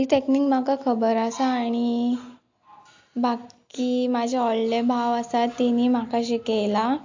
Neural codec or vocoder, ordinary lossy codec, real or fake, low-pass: none; AAC, 48 kbps; real; 7.2 kHz